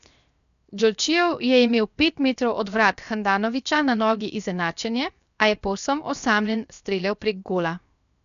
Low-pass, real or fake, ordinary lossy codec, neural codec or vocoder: 7.2 kHz; fake; none; codec, 16 kHz, 0.7 kbps, FocalCodec